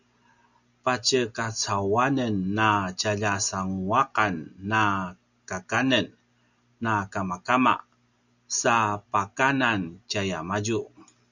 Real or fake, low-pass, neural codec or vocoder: real; 7.2 kHz; none